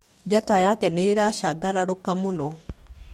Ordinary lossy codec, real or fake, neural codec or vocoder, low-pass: MP3, 64 kbps; fake; codec, 44.1 kHz, 2.6 kbps, DAC; 19.8 kHz